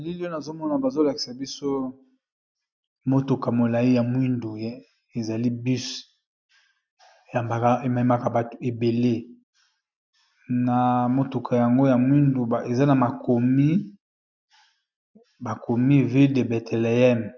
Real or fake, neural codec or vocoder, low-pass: real; none; 7.2 kHz